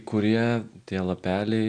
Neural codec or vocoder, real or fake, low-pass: none; real; 9.9 kHz